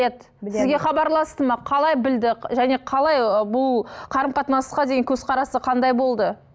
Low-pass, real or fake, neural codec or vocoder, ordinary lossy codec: none; real; none; none